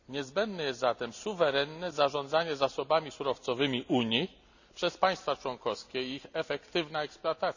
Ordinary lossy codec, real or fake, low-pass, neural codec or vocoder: none; real; 7.2 kHz; none